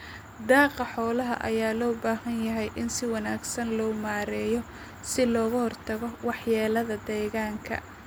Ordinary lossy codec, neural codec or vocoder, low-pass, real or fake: none; none; none; real